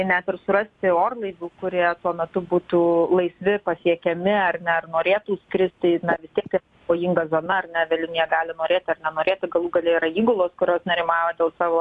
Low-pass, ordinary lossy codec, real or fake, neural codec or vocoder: 10.8 kHz; AAC, 64 kbps; real; none